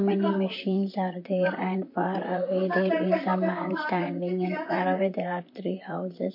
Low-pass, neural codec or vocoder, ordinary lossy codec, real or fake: 5.4 kHz; vocoder, 44.1 kHz, 128 mel bands, Pupu-Vocoder; MP3, 32 kbps; fake